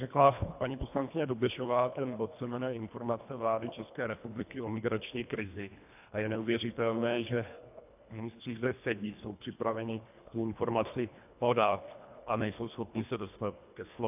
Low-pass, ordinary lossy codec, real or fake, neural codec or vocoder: 3.6 kHz; AAC, 32 kbps; fake; codec, 24 kHz, 1.5 kbps, HILCodec